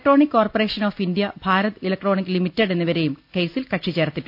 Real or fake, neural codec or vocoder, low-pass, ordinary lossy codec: real; none; 5.4 kHz; none